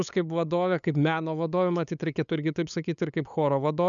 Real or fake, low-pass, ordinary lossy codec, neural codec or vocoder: fake; 7.2 kHz; MP3, 96 kbps; codec, 16 kHz, 8 kbps, FunCodec, trained on LibriTTS, 25 frames a second